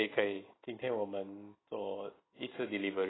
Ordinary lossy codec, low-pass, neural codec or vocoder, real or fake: AAC, 16 kbps; 7.2 kHz; none; real